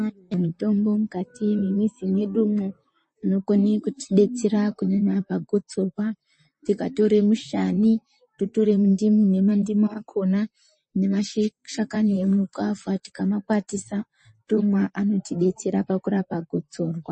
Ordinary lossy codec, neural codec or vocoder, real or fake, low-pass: MP3, 32 kbps; vocoder, 44.1 kHz, 128 mel bands, Pupu-Vocoder; fake; 10.8 kHz